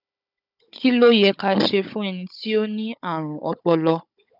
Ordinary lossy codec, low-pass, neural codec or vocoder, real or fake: none; 5.4 kHz; codec, 16 kHz, 16 kbps, FunCodec, trained on Chinese and English, 50 frames a second; fake